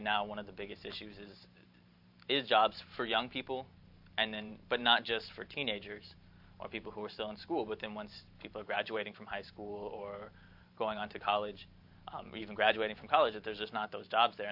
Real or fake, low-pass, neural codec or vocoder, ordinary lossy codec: fake; 5.4 kHz; vocoder, 44.1 kHz, 128 mel bands every 512 samples, BigVGAN v2; AAC, 48 kbps